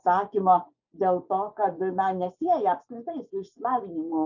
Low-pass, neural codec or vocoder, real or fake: 7.2 kHz; vocoder, 24 kHz, 100 mel bands, Vocos; fake